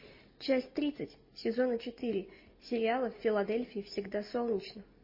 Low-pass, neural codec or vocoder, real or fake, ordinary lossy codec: 5.4 kHz; none; real; MP3, 24 kbps